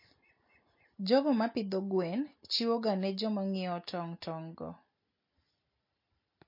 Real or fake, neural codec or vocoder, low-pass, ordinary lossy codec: real; none; 5.4 kHz; MP3, 32 kbps